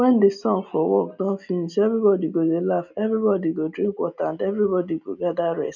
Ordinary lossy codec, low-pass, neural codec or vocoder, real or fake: none; 7.2 kHz; none; real